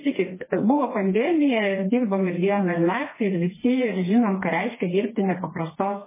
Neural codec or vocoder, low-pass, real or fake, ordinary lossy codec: codec, 16 kHz, 2 kbps, FreqCodec, smaller model; 3.6 kHz; fake; MP3, 16 kbps